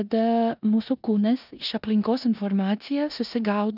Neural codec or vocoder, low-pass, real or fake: codec, 16 kHz in and 24 kHz out, 0.9 kbps, LongCat-Audio-Codec, four codebook decoder; 5.4 kHz; fake